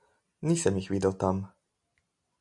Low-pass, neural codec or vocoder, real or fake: 10.8 kHz; none; real